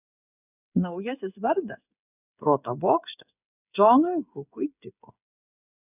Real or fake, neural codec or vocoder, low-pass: fake; codec, 16 kHz, 8 kbps, FreqCodec, smaller model; 3.6 kHz